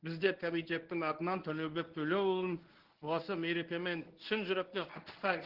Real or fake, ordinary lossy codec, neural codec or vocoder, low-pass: fake; Opus, 16 kbps; codec, 24 kHz, 0.9 kbps, WavTokenizer, medium speech release version 1; 5.4 kHz